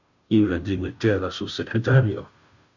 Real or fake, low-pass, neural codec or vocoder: fake; 7.2 kHz; codec, 16 kHz, 0.5 kbps, FunCodec, trained on Chinese and English, 25 frames a second